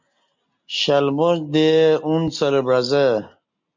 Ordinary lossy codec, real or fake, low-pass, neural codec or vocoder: MP3, 48 kbps; real; 7.2 kHz; none